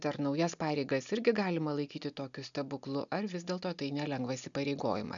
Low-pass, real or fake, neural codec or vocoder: 7.2 kHz; real; none